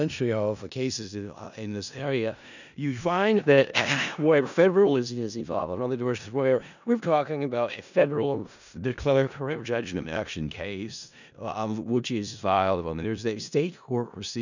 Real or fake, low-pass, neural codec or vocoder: fake; 7.2 kHz; codec, 16 kHz in and 24 kHz out, 0.4 kbps, LongCat-Audio-Codec, four codebook decoder